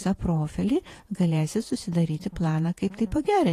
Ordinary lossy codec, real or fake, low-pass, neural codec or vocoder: AAC, 48 kbps; fake; 14.4 kHz; vocoder, 48 kHz, 128 mel bands, Vocos